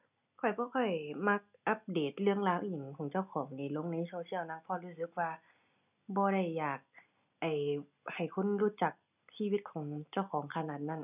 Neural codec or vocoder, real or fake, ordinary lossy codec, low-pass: none; real; none; 3.6 kHz